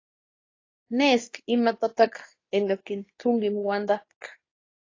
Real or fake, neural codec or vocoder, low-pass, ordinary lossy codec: fake; codec, 24 kHz, 0.9 kbps, WavTokenizer, medium speech release version 2; 7.2 kHz; AAC, 32 kbps